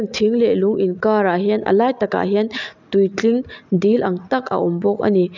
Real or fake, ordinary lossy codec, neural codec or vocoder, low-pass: real; none; none; 7.2 kHz